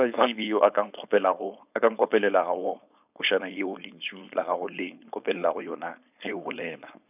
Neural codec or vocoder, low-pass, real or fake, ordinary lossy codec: codec, 16 kHz, 4.8 kbps, FACodec; 3.6 kHz; fake; none